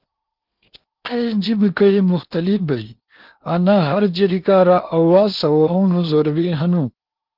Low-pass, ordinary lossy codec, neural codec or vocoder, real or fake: 5.4 kHz; Opus, 24 kbps; codec, 16 kHz in and 24 kHz out, 0.8 kbps, FocalCodec, streaming, 65536 codes; fake